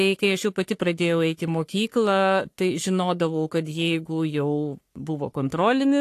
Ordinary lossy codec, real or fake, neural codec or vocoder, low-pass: AAC, 64 kbps; fake; codec, 44.1 kHz, 3.4 kbps, Pupu-Codec; 14.4 kHz